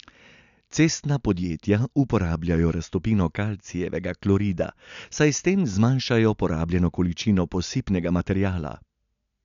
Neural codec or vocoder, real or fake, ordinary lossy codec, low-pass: none; real; none; 7.2 kHz